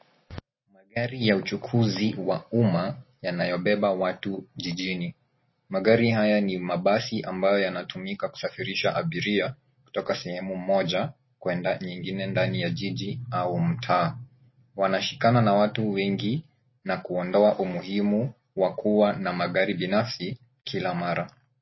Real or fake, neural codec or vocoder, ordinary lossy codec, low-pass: real; none; MP3, 24 kbps; 7.2 kHz